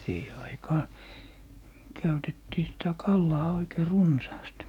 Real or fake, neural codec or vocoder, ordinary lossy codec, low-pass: fake; codec, 44.1 kHz, 7.8 kbps, DAC; none; 19.8 kHz